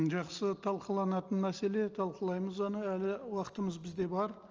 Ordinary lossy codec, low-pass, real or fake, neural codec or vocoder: Opus, 24 kbps; 7.2 kHz; real; none